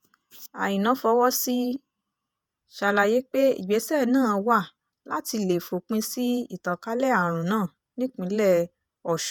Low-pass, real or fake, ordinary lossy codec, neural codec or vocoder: none; fake; none; vocoder, 48 kHz, 128 mel bands, Vocos